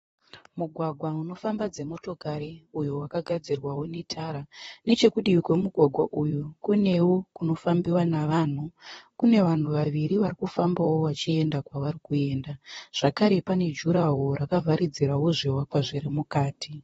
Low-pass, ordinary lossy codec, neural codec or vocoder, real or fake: 19.8 kHz; AAC, 24 kbps; vocoder, 44.1 kHz, 128 mel bands, Pupu-Vocoder; fake